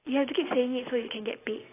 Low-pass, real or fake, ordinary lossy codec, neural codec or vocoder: 3.6 kHz; real; AAC, 16 kbps; none